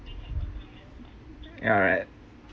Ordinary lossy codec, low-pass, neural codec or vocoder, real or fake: none; none; none; real